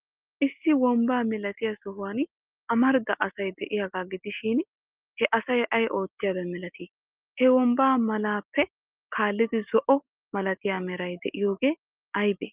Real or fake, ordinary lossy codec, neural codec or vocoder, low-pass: real; Opus, 32 kbps; none; 3.6 kHz